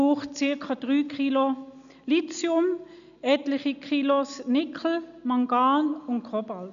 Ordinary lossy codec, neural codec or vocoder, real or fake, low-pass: none; none; real; 7.2 kHz